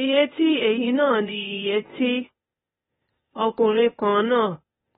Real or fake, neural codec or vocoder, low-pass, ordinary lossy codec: fake; codec, 16 kHz, 0.8 kbps, ZipCodec; 7.2 kHz; AAC, 16 kbps